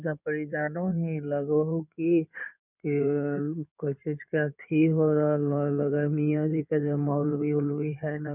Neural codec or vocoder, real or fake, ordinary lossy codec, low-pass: codec, 16 kHz in and 24 kHz out, 2.2 kbps, FireRedTTS-2 codec; fake; none; 3.6 kHz